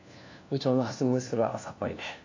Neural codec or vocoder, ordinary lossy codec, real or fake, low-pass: codec, 16 kHz, 1 kbps, FunCodec, trained on LibriTTS, 50 frames a second; AAC, 48 kbps; fake; 7.2 kHz